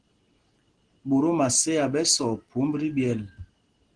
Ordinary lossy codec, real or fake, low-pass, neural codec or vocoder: Opus, 16 kbps; real; 9.9 kHz; none